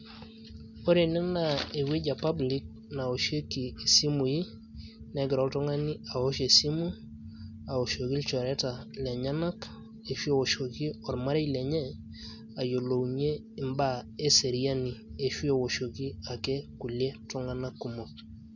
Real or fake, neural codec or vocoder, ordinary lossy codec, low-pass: real; none; none; 7.2 kHz